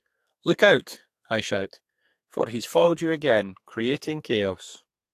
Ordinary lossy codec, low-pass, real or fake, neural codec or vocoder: AAC, 64 kbps; 14.4 kHz; fake; codec, 32 kHz, 1.9 kbps, SNAC